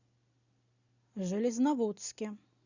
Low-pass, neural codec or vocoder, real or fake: 7.2 kHz; none; real